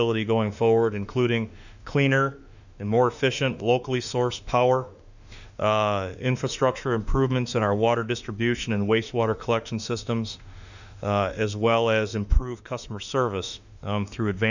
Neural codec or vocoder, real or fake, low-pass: autoencoder, 48 kHz, 32 numbers a frame, DAC-VAE, trained on Japanese speech; fake; 7.2 kHz